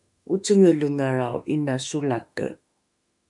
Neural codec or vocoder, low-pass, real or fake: autoencoder, 48 kHz, 32 numbers a frame, DAC-VAE, trained on Japanese speech; 10.8 kHz; fake